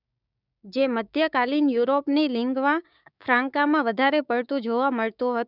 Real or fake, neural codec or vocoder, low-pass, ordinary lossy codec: fake; codec, 16 kHz, 6 kbps, DAC; 5.4 kHz; none